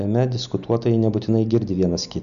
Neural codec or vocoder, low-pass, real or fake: none; 7.2 kHz; real